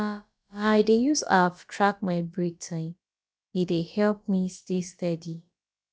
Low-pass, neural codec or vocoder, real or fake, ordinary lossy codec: none; codec, 16 kHz, about 1 kbps, DyCAST, with the encoder's durations; fake; none